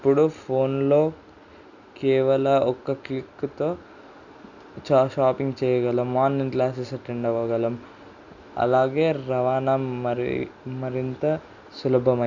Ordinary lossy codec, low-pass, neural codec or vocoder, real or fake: none; 7.2 kHz; none; real